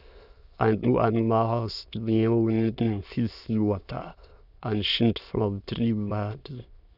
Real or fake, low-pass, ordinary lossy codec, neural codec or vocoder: fake; 5.4 kHz; none; autoencoder, 22.05 kHz, a latent of 192 numbers a frame, VITS, trained on many speakers